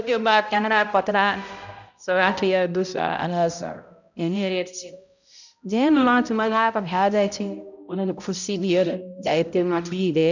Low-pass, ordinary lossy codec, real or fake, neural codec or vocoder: 7.2 kHz; none; fake; codec, 16 kHz, 0.5 kbps, X-Codec, HuBERT features, trained on balanced general audio